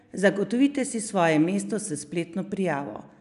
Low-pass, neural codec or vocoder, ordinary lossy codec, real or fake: 10.8 kHz; none; none; real